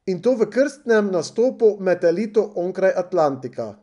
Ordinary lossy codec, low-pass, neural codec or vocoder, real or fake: none; 10.8 kHz; none; real